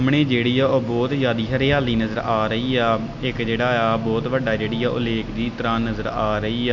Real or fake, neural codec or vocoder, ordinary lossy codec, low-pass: real; none; none; 7.2 kHz